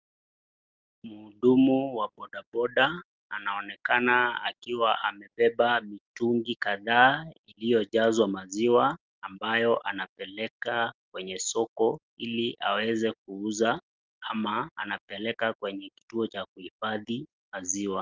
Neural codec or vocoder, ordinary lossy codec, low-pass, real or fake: none; Opus, 24 kbps; 7.2 kHz; real